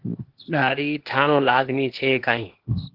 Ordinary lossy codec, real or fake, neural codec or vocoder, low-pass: Opus, 16 kbps; fake; codec, 16 kHz, 0.8 kbps, ZipCodec; 5.4 kHz